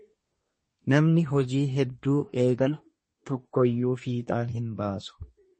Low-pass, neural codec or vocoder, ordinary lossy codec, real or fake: 10.8 kHz; codec, 24 kHz, 1 kbps, SNAC; MP3, 32 kbps; fake